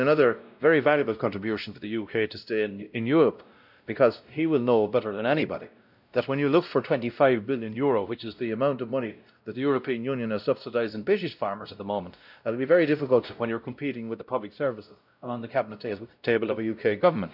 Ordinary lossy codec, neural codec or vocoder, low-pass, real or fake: none; codec, 16 kHz, 0.5 kbps, X-Codec, WavLM features, trained on Multilingual LibriSpeech; 5.4 kHz; fake